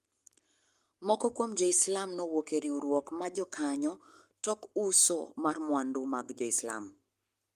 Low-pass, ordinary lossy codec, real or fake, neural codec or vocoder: 14.4 kHz; Opus, 32 kbps; fake; codec, 44.1 kHz, 7.8 kbps, Pupu-Codec